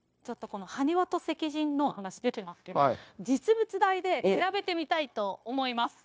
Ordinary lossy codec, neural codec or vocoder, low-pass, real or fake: none; codec, 16 kHz, 0.9 kbps, LongCat-Audio-Codec; none; fake